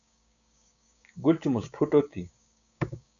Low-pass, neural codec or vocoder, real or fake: 7.2 kHz; codec, 16 kHz, 6 kbps, DAC; fake